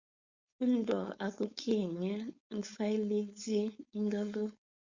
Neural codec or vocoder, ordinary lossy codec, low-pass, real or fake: codec, 16 kHz, 4.8 kbps, FACodec; Opus, 64 kbps; 7.2 kHz; fake